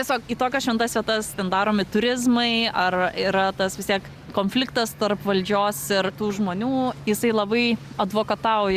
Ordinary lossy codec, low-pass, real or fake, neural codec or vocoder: Opus, 64 kbps; 14.4 kHz; real; none